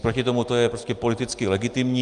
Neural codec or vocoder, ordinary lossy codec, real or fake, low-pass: none; Opus, 32 kbps; real; 10.8 kHz